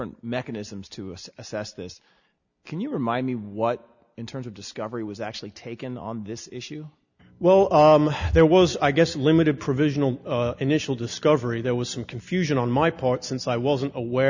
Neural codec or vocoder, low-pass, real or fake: none; 7.2 kHz; real